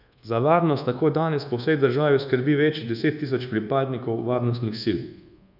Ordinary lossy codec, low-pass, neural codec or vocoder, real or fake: none; 5.4 kHz; codec, 24 kHz, 1.2 kbps, DualCodec; fake